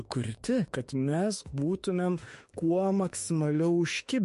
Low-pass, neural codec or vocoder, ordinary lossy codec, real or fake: 14.4 kHz; autoencoder, 48 kHz, 32 numbers a frame, DAC-VAE, trained on Japanese speech; MP3, 48 kbps; fake